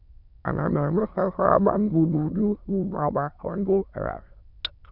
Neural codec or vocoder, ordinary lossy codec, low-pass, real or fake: autoencoder, 22.05 kHz, a latent of 192 numbers a frame, VITS, trained on many speakers; none; 5.4 kHz; fake